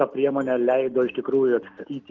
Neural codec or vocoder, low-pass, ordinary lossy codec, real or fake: none; 7.2 kHz; Opus, 32 kbps; real